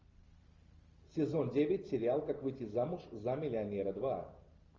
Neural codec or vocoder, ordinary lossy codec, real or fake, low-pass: none; Opus, 32 kbps; real; 7.2 kHz